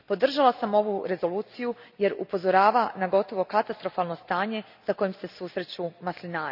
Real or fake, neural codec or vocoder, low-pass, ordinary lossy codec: real; none; 5.4 kHz; none